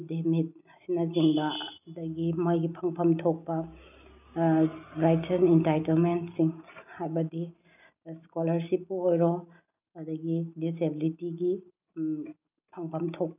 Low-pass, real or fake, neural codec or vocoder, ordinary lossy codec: 3.6 kHz; real; none; none